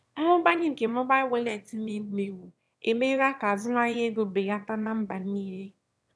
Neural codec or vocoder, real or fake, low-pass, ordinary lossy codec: autoencoder, 22.05 kHz, a latent of 192 numbers a frame, VITS, trained on one speaker; fake; none; none